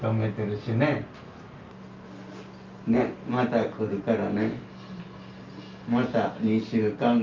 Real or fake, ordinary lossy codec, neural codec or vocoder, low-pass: real; Opus, 24 kbps; none; 7.2 kHz